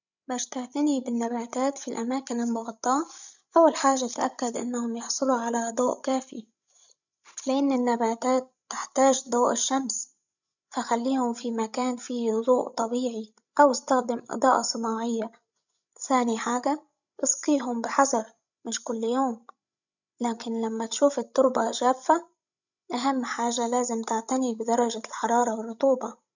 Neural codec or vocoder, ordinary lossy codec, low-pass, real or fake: codec, 16 kHz, 16 kbps, FreqCodec, larger model; none; 7.2 kHz; fake